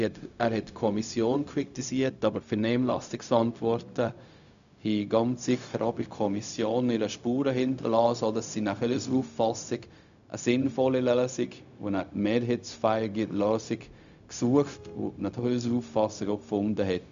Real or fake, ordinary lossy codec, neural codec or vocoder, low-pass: fake; MP3, 96 kbps; codec, 16 kHz, 0.4 kbps, LongCat-Audio-Codec; 7.2 kHz